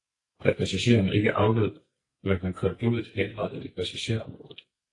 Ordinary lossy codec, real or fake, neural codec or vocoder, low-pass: AAC, 32 kbps; fake; codec, 44.1 kHz, 2.6 kbps, SNAC; 10.8 kHz